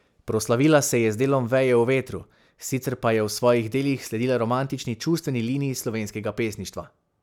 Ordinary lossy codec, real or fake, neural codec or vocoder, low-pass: none; real; none; 19.8 kHz